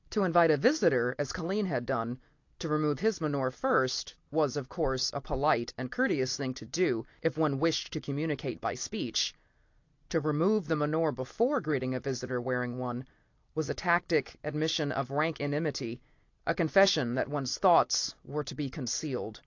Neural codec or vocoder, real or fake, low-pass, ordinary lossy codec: none; real; 7.2 kHz; AAC, 48 kbps